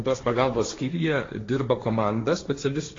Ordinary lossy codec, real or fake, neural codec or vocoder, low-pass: AAC, 32 kbps; fake; codec, 16 kHz, 1.1 kbps, Voila-Tokenizer; 7.2 kHz